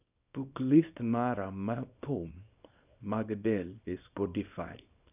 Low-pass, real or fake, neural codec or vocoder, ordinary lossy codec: 3.6 kHz; fake; codec, 24 kHz, 0.9 kbps, WavTokenizer, small release; none